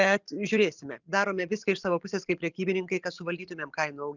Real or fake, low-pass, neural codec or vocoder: real; 7.2 kHz; none